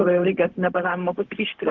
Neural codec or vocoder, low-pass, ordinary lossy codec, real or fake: codec, 16 kHz, 0.4 kbps, LongCat-Audio-Codec; 7.2 kHz; Opus, 16 kbps; fake